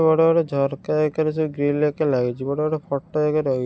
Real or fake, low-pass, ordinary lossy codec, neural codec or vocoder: real; none; none; none